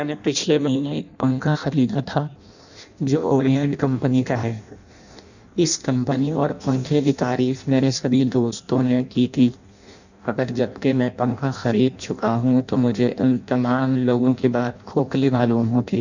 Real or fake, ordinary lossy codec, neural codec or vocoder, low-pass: fake; none; codec, 16 kHz in and 24 kHz out, 0.6 kbps, FireRedTTS-2 codec; 7.2 kHz